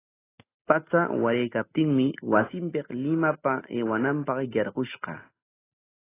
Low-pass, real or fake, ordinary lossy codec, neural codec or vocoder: 3.6 kHz; real; AAC, 16 kbps; none